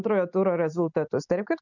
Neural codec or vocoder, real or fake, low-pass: none; real; 7.2 kHz